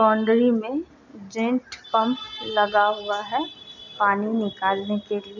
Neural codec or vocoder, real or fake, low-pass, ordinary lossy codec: none; real; 7.2 kHz; none